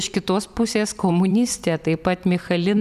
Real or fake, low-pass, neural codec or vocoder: fake; 14.4 kHz; vocoder, 44.1 kHz, 128 mel bands every 256 samples, BigVGAN v2